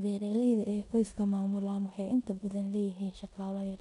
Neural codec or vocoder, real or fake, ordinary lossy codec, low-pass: codec, 16 kHz in and 24 kHz out, 0.9 kbps, LongCat-Audio-Codec, four codebook decoder; fake; none; 10.8 kHz